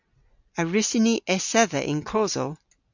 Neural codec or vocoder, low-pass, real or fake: none; 7.2 kHz; real